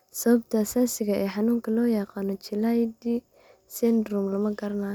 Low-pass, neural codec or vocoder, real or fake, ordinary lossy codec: none; none; real; none